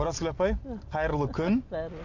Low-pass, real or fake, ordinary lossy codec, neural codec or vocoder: 7.2 kHz; real; AAC, 48 kbps; none